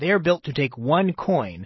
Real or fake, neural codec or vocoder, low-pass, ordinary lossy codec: real; none; 7.2 kHz; MP3, 24 kbps